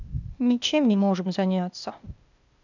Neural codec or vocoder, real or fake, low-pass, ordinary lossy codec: codec, 16 kHz, 0.8 kbps, ZipCodec; fake; 7.2 kHz; none